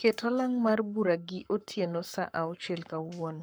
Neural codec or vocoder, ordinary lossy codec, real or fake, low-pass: codec, 44.1 kHz, 7.8 kbps, Pupu-Codec; none; fake; none